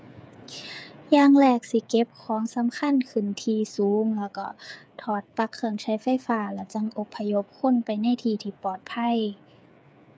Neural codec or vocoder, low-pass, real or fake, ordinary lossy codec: codec, 16 kHz, 8 kbps, FreqCodec, smaller model; none; fake; none